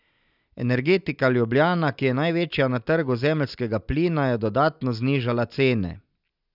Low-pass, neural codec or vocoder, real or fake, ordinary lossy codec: 5.4 kHz; none; real; none